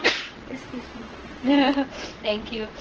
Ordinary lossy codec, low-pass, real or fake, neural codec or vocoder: Opus, 16 kbps; 7.2 kHz; fake; vocoder, 22.05 kHz, 80 mel bands, WaveNeXt